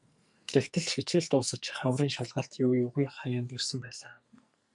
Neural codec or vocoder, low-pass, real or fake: codec, 44.1 kHz, 2.6 kbps, SNAC; 10.8 kHz; fake